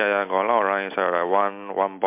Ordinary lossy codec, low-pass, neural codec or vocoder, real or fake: MP3, 32 kbps; 3.6 kHz; none; real